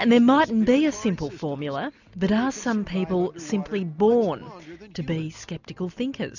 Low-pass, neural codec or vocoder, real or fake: 7.2 kHz; none; real